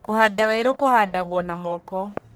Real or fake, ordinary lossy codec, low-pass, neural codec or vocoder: fake; none; none; codec, 44.1 kHz, 1.7 kbps, Pupu-Codec